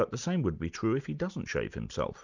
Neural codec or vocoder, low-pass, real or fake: none; 7.2 kHz; real